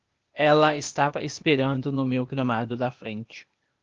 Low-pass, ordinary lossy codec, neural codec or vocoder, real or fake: 7.2 kHz; Opus, 32 kbps; codec, 16 kHz, 0.8 kbps, ZipCodec; fake